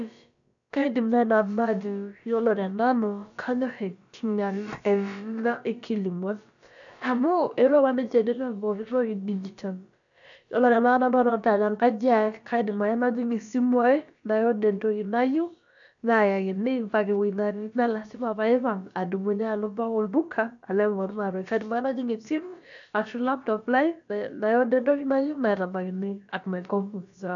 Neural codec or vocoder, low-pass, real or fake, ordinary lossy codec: codec, 16 kHz, about 1 kbps, DyCAST, with the encoder's durations; 7.2 kHz; fake; none